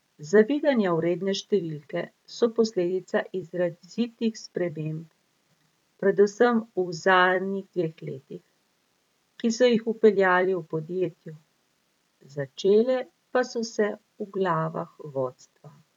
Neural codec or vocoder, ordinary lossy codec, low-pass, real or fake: vocoder, 44.1 kHz, 128 mel bands every 256 samples, BigVGAN v2; none; 19.8 kHz; fake